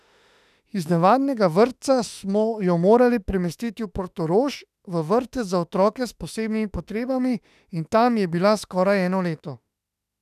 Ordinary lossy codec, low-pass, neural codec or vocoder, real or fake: none; 14.4 kHz; autoencoder, 48 kHz, 32 numbers a frame, DAC-VAE, trained on Japanese speech; fake